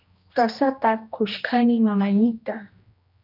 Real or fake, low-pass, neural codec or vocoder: fake; 5.4 kHz; codec, 16 kHz, 1 kbps, X-Codec, HuBERT features, trained on general audio